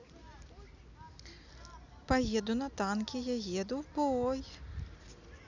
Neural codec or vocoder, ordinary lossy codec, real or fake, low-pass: none; none; real; 7.2 kHz